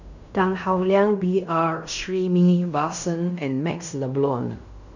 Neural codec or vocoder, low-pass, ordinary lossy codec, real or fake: codec, 16 kHz in and 24 kHz out, 0.9 kbps, LongCat-Audio-Codec, fine tuned four codebook decoder; 7.2 kHz; none; fake